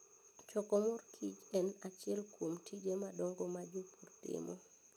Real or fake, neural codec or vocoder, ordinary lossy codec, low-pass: real; none; none; none